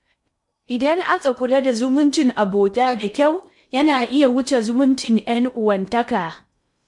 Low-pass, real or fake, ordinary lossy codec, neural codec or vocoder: 10.8 kHz; fake; MP3, 64 kbps; codec, 16 kHz in and 24 kHz out, 0.6 kbps, FocalCodec, streaming, 2048 codes